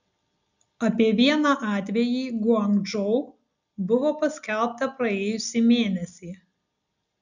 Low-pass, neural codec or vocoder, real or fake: 7.2 kHz; none; real